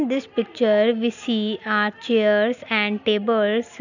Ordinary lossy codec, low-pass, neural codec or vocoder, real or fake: none; 7.2 kHz; none; real